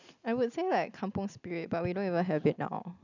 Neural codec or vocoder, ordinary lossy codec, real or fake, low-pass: none; none; real; 7.2 kHz